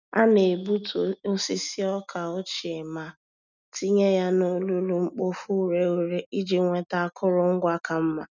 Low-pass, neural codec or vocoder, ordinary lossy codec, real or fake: 7.2 kHz; none; none; real